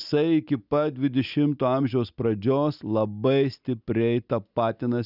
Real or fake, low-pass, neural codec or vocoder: real; 5.4 kHz; none